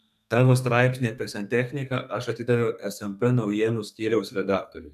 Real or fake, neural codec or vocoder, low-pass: fake; codec, 32 kHz, 1.9 kbps, SNAC; 14.4 kHz